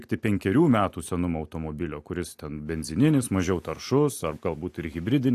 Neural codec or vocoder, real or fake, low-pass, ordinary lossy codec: none; real; 14.4 kHz; AAC, 64 kbps